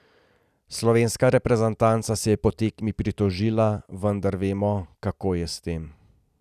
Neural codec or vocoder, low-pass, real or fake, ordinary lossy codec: none; 14.4 kHz; real; none